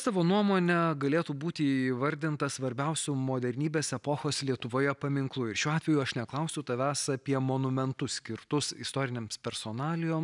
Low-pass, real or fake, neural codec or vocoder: 10.8 kHz; real; none